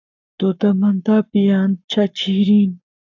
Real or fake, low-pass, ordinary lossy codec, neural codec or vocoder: fake; 7.2 kHz; AAC, 48 kbps; codec, 44.1 kHz, 7.8 kbps, Pupu-Codec